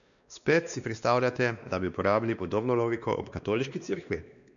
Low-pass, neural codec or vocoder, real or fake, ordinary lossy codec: 7.2 kHz; codec, 16 kHz, 2 kbps, X-Codec, WavLM features, trained on Multilingual LibriSpeech; fake; none